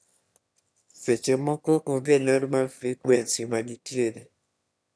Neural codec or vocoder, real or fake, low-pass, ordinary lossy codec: autoencoder, 22.05 kHz, a latent of 192 numbers a frame, VITS, trained on one speaker; fake; none; none